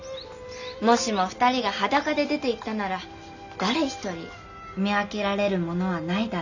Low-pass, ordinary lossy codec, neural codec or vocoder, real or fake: 7.2 kHz; none; none; real